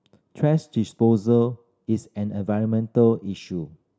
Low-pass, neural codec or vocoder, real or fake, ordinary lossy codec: none; none; real; none